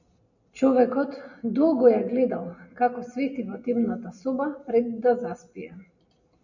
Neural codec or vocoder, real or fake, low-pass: none; real; 7.2 kHz